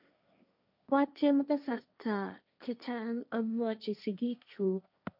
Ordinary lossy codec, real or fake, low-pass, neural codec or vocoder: none; fake; 5.4 kHz; codec, 16 kHz, 1.1 kbps, Voila-Tokenizer